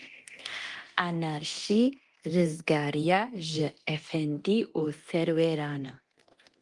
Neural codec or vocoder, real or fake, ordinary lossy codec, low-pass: codec, 24 kHz, 0.9 kbps, DualCodec; fake; Opus, 24 kbps; 10.8 kHz